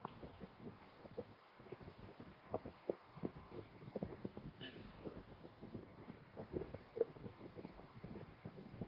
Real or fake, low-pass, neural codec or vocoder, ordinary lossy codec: fake; 5.4 kHz; codec, 44.1 kHz, 2.6 kbps, DAC; Opus, 16 kbps